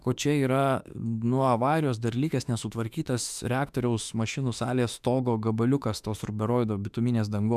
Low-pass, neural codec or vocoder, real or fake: 14.4 kHz; autoencoder, 48 kHz, 32 numbers a frame, DAC-VAE, trained on Japanese speech; fake